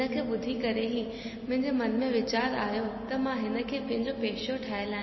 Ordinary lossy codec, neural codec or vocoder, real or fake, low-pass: MP3, 24 kbps; none; real; 7.2 kHz